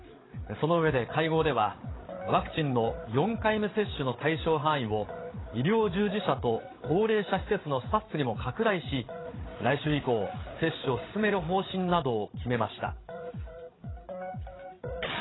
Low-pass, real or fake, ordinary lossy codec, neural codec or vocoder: 7.2 kHz; fake; AAC, 16 kbps; codec, 16 kHz, 8 kbps, FreqCodec, larger model